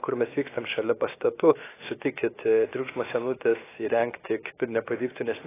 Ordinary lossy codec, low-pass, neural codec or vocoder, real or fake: AAC, 16 kbps; 3.6 kHz; codec, 16 kHz, 0.7 kbps, FocalCodec; fake